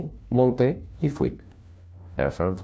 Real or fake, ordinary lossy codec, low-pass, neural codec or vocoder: fake; none; none; codec, 16 kHz, 1 kbps, FunCodec, trained on LibriTTS, 50 frames a second